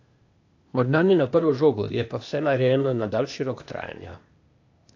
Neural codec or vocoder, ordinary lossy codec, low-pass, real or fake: codec, 16 kHz, 0.8 kbps, ZipCodec; AAC, 48 kbps; 7.2 kHz; fake